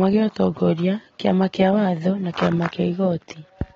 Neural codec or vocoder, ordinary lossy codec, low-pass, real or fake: none; AAC, 24 kbps; 7.2 kHz; real